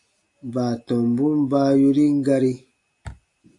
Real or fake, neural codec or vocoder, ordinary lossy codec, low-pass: real; none; AAC, 48 kbps; 10.8 kHz